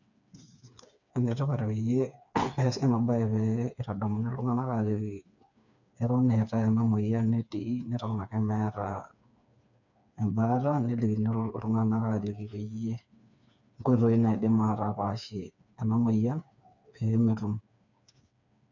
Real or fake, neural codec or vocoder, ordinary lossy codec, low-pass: fake; codec, 16 kHz, 4 kbps, FreqCodec, smaller model; none; 7.2 kHz